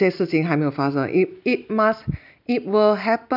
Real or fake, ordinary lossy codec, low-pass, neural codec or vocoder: real; none; 5.4 kHz; none